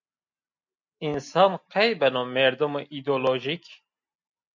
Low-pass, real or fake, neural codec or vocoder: 7.2 kHz; real; none